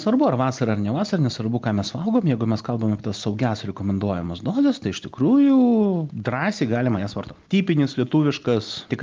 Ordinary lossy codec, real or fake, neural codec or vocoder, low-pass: Opus, 32 kbps; real; none; 7.2 kHz